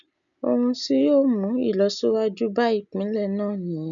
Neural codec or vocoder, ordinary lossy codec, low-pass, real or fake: none; none; 7.2 kHz; real